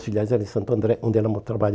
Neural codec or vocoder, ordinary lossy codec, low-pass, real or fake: none; none; none; real